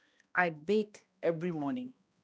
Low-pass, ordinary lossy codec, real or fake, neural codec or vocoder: none; none; fake; codec, 16 kHz, 1 kbps, X-Codec, HuBERT features, trained on balanced general audio